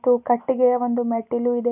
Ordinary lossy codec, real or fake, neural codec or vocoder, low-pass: none; real; none; 3.6 kHz